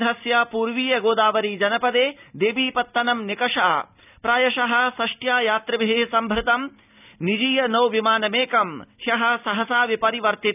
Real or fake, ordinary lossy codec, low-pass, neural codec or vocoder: real; none; 3.6 kHz; none